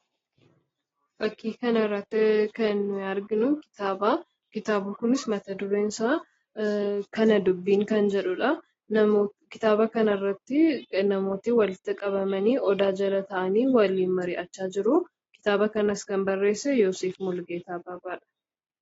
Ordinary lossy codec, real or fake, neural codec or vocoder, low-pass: AAC, 24 kbps; real; none; 7.2 kHz